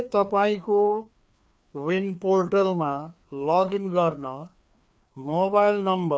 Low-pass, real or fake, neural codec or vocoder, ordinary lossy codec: none; fake; codec, 16 kHz, 2 kbps, FreqCodec, larger model; none